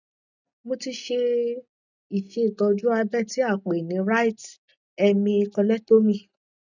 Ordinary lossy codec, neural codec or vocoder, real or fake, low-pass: none; none; real; 7.2 kHz